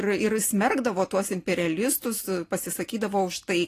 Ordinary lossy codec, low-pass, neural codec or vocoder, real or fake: AAC, 48 kbps; 14.4 kHz; none; real